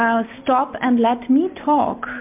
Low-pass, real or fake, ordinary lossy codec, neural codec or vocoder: 3.6 kHz; real; MP3, 32 kbps; none